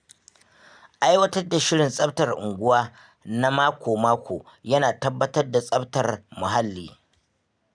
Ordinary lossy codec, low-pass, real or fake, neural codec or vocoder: none; 9.9 kHz; real; none